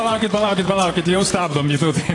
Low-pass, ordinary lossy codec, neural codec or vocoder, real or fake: 10.8 kHz; AAC, 32 kbps; vocoder, 44.1 kHz, 128 mel bands, Pupu-Vocoder; fake